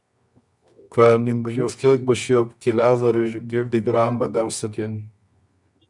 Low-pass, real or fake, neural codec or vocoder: 10.8 kHz; fake; codec, 24 kHz, 0.9 kbps, WavTokenizer, medium music audio release